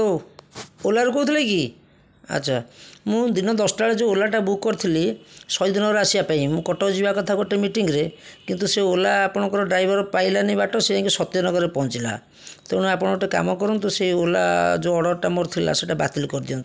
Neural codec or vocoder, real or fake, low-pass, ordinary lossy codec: none; real; none; none